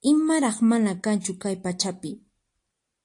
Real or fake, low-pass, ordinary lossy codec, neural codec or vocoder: real; 10.8 kHz; AAC, 64 kbps; none